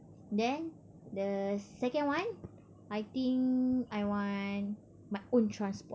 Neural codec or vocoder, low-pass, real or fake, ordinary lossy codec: none; none; real; none